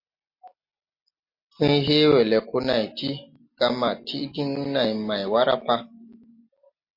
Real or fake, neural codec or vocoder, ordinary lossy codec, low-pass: real; none; MP3, 32 kbps; 5.4 kHz